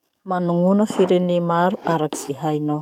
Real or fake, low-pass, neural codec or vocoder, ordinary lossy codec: fake; 19.8 kHz; codec, 44.1 kHz, 7.8 kbps, DAC; none